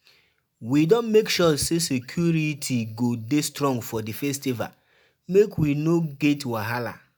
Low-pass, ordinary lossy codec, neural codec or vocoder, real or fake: none; none; none; real